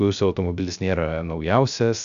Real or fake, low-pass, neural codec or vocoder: fake; 7.2 kHz; codec, 16 kHz, 0.3 kbps, FocalCodec